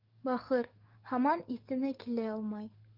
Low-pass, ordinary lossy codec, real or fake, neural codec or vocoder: 5.4 kHz; Opus, 32 kbps; fake; codec, 44.1 kHz, 7.8 kbps, DAC